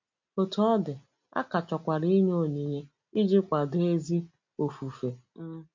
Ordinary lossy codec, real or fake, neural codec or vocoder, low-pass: MP3, 48 kbps; real; none; 7.2 kHz